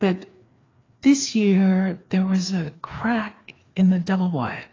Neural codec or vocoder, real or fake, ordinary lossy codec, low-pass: codec, 16 kHz, 2 kbps, FreqCodec, larger model; fake; AAC, 32 kbps; 7.2 kHz